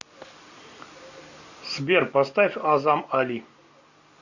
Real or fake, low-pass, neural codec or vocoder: real; 7.2 kHz; none